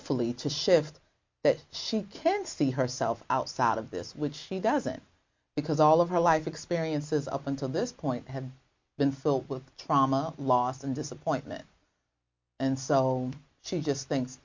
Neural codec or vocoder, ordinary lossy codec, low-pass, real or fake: none; MP3, 48 kbps; 7.2 kHz; real